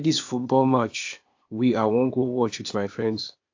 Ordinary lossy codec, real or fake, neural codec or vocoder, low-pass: AAC, 48 kbps; fake; codec, 16 kHz, 0.8 kbps, ZipCodec; 7.2 kHz